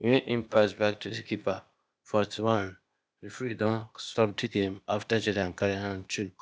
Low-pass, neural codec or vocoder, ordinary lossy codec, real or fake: none; codec, 16 kHz, 0.8 kbps, ZipCodec; none; fake